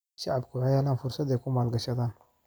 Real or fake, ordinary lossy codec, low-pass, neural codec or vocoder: real; none; none; none